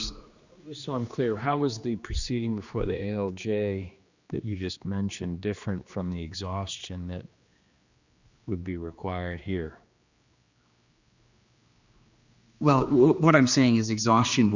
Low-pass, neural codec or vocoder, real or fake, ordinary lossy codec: 7.2 kHz; codec, 16 kHz, 2 kbps, X-Codec, HuBERT features, trained on general audio; fake; Opus, 64 kbps